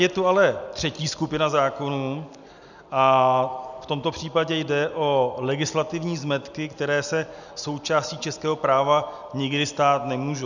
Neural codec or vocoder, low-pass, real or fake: none; 7.2 kHz; real